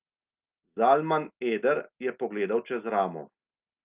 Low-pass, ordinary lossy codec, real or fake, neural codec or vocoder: 3.6 kHz; Opus, 24 kbps; real; none